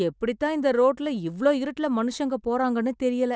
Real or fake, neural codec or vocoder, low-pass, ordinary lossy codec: real; none; none; none